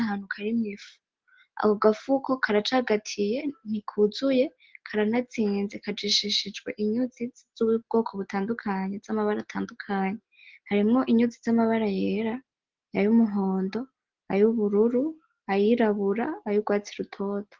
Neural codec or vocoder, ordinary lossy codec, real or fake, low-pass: none; Opus, 16 kbps; real; 7.2 kHz